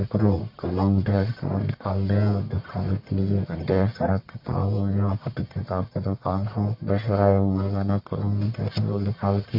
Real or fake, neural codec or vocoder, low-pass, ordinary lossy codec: fake; codec, 44.1 kHz, 1.7 kbps, Pupu-Codec; 5.4 kHz; MP3, 32 kbps